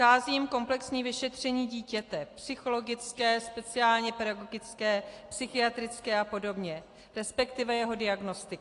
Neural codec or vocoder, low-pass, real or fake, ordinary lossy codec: none; 10.8 kHz; real; AAC, 48 kbps